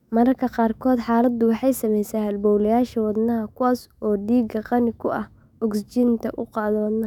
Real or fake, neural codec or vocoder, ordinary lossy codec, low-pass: fake; autoencoder, 48 kHz, 128 numbers a frame, DAC-VAE, trained on Japanese speech; none; 19.8 kHz